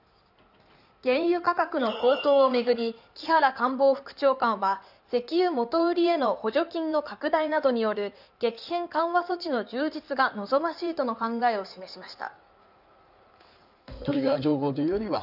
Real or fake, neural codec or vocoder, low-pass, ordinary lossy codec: fake; codec, 16 kHz in and 24 kHz out, 2.2 kbps, FireRedTTS-2 codec; 5.4 kHz; none